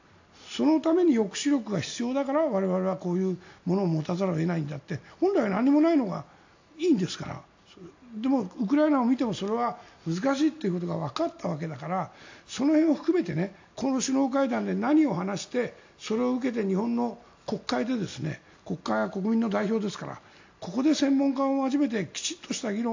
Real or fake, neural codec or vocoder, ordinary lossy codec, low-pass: real; none; AAC, 48 kbps; 7.2 kHz